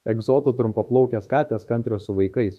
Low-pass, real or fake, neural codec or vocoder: 14.4 kHz; fake; autoencoder, 48 kHz, 32 numbers a frame, DAC-VAE, trained on Japanese speech